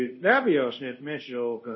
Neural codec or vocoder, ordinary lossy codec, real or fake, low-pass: codec, 24 kHz, 0.5 kbps, DualCodec; MP3, 24 kbps; fake; 7.2 kHz